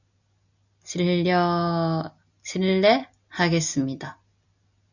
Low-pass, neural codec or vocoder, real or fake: 7.2 kHz; none; real